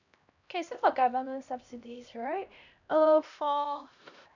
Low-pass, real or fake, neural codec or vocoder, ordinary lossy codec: 7.2 kHz; fake; codec, 16 kHz, 1 kbps, X-Codec, HuBERT features, trained on LibriSpeech; none